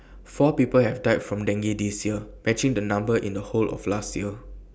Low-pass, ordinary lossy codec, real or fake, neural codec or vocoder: none; none; real; none